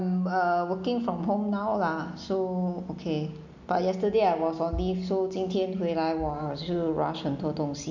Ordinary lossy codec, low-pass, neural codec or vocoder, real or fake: none; 7.2 kHz; none; real